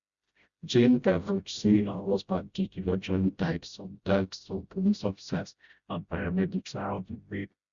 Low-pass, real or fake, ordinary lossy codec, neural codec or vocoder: 7.2 kHz; fake; Opus, 64 kbps; codec, 16 kHz, 0.5 kbps, FreqCodec, smaller model